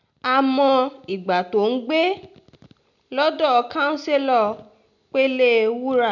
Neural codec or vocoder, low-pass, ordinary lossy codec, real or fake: none; 7.2 kHz; none; real